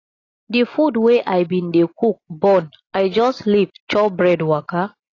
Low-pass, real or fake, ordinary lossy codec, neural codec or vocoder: 7.2 kHz; real; AAC, 32 kbps; none